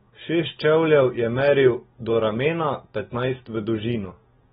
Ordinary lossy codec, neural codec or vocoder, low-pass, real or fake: AAC, 16 kbps; none; 19.8 kHz; real